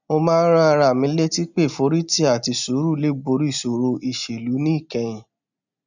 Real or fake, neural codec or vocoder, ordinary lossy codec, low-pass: real; none; none; 7.2 kHz